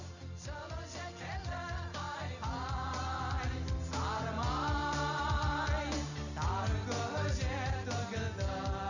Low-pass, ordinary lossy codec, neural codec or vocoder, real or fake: 7.2 kHz; none; none; real